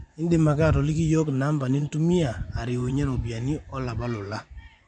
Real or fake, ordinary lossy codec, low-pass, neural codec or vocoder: fake; none; 9.9 kHz; vocoder, 24 kHz, 100 mel bands, Vocos